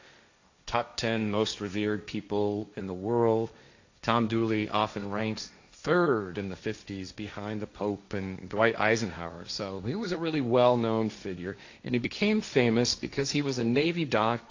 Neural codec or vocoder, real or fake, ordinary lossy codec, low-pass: codec, 16 kHz, 1.1 kbps, Voila-Tokenizer; fake; AAC, 48 kbps; 7.2 kHz